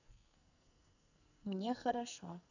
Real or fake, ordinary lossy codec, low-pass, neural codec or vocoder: fake; none; 7.2 kHz; codec, 44.1 kHz, 2.6 kbps, SNAC